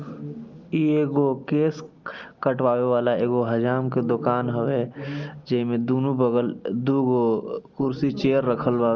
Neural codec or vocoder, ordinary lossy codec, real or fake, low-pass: none; Opus, 24 kbps; real; 7.2 kHz